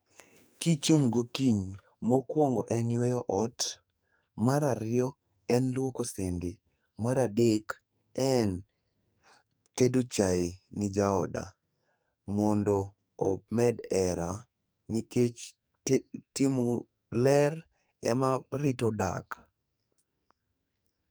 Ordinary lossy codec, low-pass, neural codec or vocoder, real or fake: none; none; codec, 44.1 kHz, 2.6 kbps, SNAC; fake